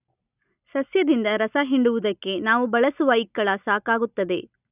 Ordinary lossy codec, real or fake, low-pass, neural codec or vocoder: none; real; 3.6 kHz; none